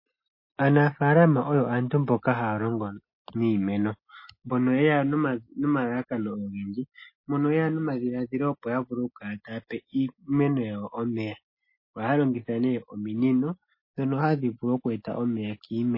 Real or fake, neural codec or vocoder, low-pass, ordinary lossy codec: real; none; 5.4 kHz; MP3, 24 kbps